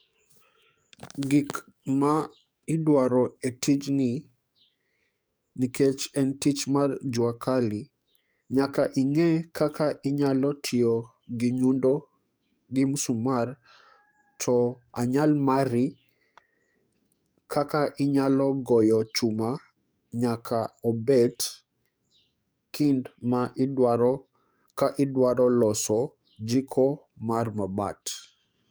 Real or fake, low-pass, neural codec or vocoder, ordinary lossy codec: fake; none; codec, 44.1 kHz, 7.8 kbps, DAC; none